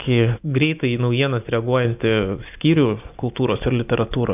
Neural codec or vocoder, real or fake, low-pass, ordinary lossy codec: none; real; 3.6 kHz; AAC, 32 kbps